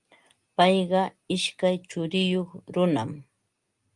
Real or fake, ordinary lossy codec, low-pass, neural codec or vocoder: real; Opus, 32 kbps; 10.8 kHz; none